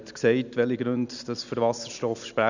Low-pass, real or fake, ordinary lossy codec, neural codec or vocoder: 7.2 kHz; real; none; none